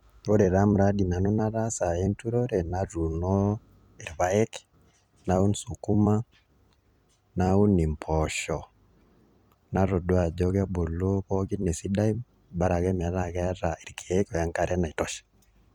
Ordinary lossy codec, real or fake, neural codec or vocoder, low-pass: none; fake; vocoder, 44.1 kHz, 128 mel bands every 256 samples, BigVGAN v2; 19.8 kHz